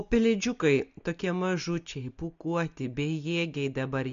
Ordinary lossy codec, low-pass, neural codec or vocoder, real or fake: MP3, 48 kbps; 7.2 kHz; none; real